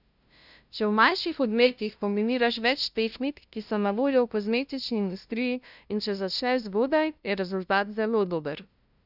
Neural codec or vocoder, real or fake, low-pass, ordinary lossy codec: codec, 16 kHz, 0.5 kbps, FunCodec, trained on LibriTTS, 25 frames a second; fake; 5.4 kHz; none